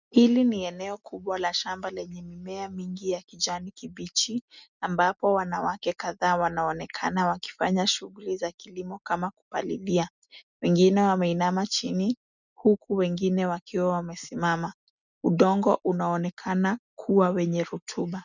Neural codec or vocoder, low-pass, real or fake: none; 7.2 kHz; real